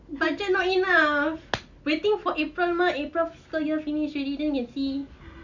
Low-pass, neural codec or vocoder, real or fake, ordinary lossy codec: 7.2 kHz; none; real; none